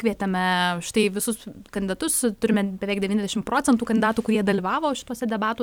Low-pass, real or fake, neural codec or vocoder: 19.8 kHz; fake; vocoder, 44.1 kHz, 128 mel bands every 256 samples, BigVGAN v2